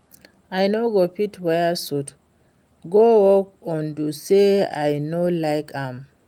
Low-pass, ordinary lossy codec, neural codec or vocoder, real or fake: 19.8 kHz; Opus, 32 kbps; none; real